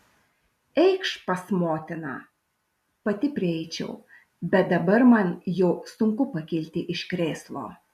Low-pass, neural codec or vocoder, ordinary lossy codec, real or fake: 14.4 kHz; none; AAC, 96 kbps; real